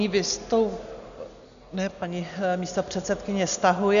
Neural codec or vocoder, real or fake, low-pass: none; real; 7.2 kHz